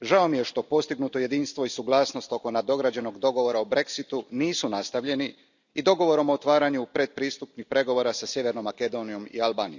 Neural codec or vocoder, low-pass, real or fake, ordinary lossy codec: none; 7.2 kHz; real; none